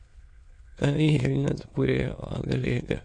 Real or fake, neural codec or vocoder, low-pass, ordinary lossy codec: fake; autoencoder, 22.05 kHz, a latent of 192 numbers a frame, VITS, trained on many speakers; 9.9 kHz; MP3, 48 kbps